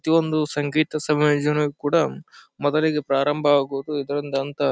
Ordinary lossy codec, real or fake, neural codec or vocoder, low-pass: none; real; none; none